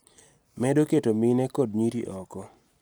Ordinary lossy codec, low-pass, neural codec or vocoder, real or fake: none; none; none; real